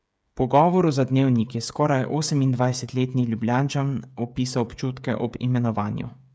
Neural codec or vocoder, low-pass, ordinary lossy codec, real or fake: codec, 16 kHz, 16 kbps, FreqCodec, smaller model; none; none; fake